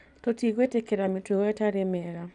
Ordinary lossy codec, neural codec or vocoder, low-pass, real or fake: none; none; 10.8 kHz; real